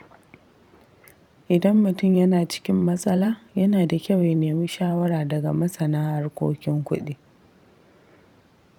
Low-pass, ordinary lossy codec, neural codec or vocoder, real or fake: 19.8 kHz; none; vocoder, 44.1 kHz, 128 mel bands every 512 samples, BigVGAN v2; fake